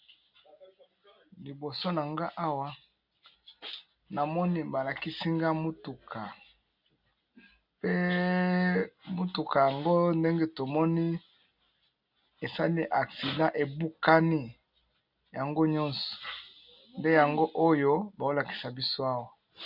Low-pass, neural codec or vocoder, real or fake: 5.4 kHz; none; real